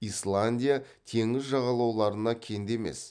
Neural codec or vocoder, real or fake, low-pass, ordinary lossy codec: none; real; 9.9 kHz; none